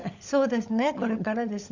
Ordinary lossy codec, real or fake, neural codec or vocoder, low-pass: Opus, 64 kbps; fake; codec, 16 kHz, 16 kbps, FunCodec, trained on LibriTTS, 50 frames a second; 7.2 kHz